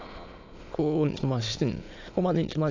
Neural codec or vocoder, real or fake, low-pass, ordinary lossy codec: autoencoder, 22.05 kHz, a latent of 192 numbers a frame, VITS, trained on many speakers; fake; 7.2 kHz; AAC, 48 kbps